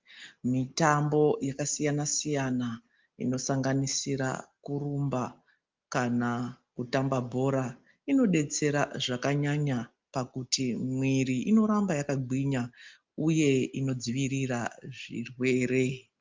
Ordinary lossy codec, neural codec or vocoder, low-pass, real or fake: Opus, 24 kbps; none; 7.2 kHz; real